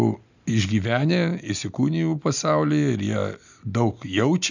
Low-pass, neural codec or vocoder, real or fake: 7.2 kHz; none; real